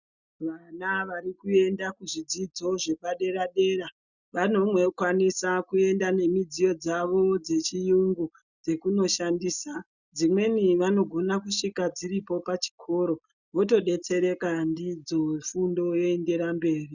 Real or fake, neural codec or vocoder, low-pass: real; none; 7.2 kHz